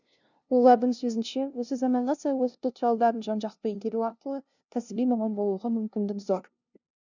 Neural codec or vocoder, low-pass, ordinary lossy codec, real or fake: codec, 16 kHz, 0.5 kbps, FunCodec, trained on LibriTTS, 25 frames a second; 7.2 kHz; none; fake